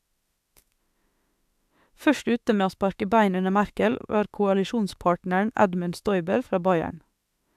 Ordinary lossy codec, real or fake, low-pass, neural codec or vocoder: AAC, 96 kbps; fake; 14.4 kHz; autoencoder, 48 kHz, 32 numbers a frame, DAC-VAE, trained on Japanese speech